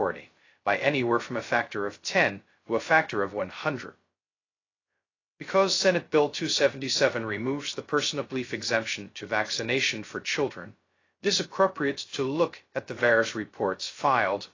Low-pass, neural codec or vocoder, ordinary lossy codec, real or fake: 7.2 kHz; codec, 16 kHz, 0.2 kbps, FocalCodec; AAC, 32 kbps; fake